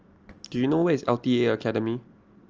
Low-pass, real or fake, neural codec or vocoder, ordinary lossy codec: 7.2 kHz; real; none; Opus, 24 kbps